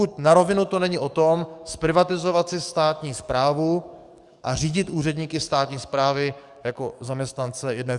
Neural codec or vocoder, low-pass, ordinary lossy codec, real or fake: codec, 44.1 kHz, 7.8 kbps, DAC; 10.8 kHz; Opus, 64 kbps; fake